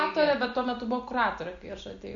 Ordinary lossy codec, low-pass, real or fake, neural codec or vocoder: MP3, 64 kbps; 7.2 kHz; real; none